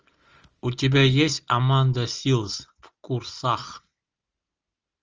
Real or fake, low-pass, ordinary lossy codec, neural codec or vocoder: real; 7.2 kHz; Opus, 24 kbps; none